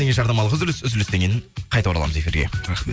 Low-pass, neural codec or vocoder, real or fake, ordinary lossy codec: none; none; real; none